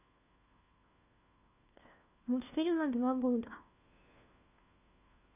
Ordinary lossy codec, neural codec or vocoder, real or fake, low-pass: none; codec, 16 kHz, 1 kbps, FunCodec, trained on LibriTTS, 50 frames a second; fake; 3.6 kHz